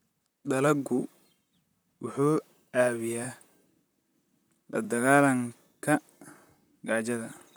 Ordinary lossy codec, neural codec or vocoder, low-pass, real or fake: none; vocoder, 44.1 kHz, 128 mel bands, Pupu-Vocoder; none; fake